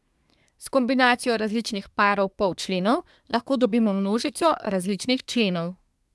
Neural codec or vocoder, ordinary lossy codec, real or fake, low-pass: codec, 24 kHz, 1 kbps, SNAC; none; fake; none